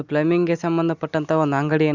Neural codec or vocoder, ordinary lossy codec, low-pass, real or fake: none; Opus, 64 kbps; 7.2 kHz; real